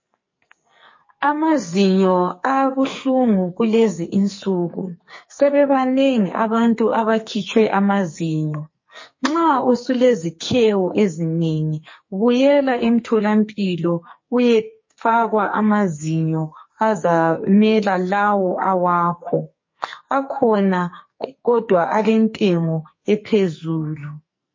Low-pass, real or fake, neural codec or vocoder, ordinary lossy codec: 7.2 kHz; fake; codec, 44.1 kHz, 2.6 kbps, SNAC; MP3, 32 kbps